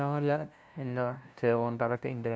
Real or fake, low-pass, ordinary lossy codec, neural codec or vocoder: fake; none; none; codec, 16 kHz, 0.5 kbps, FunCodec, trained on LibriTTS, 25 frames a second